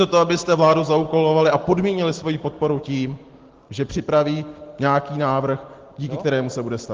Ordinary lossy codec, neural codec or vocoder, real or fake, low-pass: Opus, 16 kbps; none; real; 7.2 kHz